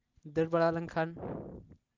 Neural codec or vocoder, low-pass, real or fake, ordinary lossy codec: none; 7.2 kHz; real; Opus, 24 kbps